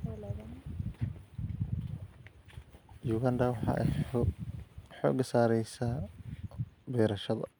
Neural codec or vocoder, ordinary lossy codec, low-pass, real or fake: none; none; none; real